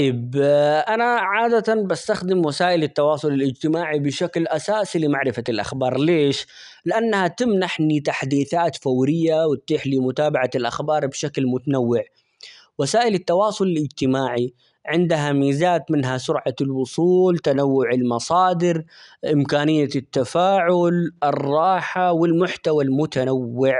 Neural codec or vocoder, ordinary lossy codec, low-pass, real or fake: none; none; 10.8 kHz; real